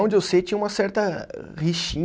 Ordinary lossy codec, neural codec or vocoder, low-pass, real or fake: none; none; none; real